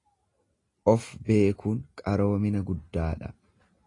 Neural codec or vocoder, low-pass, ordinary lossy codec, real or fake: none; 10.8 kHz; AAC, 32 kbps; real